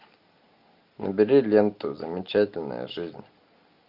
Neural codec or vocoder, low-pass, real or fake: none; 5.4 kHz; real